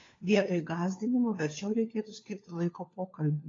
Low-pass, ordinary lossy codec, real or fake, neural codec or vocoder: 7.2 kHz; AAC, 32 kbps; fake; codec, 16 kHz, 4 kbps, FunCodec, trained on LibriTTS, 50 frames a second